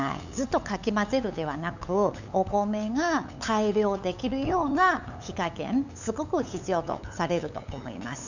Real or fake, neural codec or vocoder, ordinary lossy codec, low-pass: fake; codec, 16 kHz, 8 kbps, FunCodec, trained on LibriTTS, 25 frames a second; none; 7.2 kHz